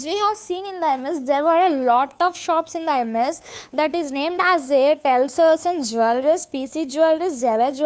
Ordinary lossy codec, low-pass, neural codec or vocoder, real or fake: none; none; codec, 16 kHz, 2 kbps, FunCodec, trained on Chinese and English, 25 frames a second; fake